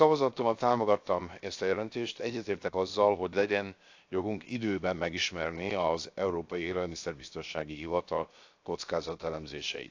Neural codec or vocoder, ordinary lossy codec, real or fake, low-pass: codec, 16 kHz, 0.7 kbps, FocalCodec; AAC, 48 kbps; fake; 7.2 kHz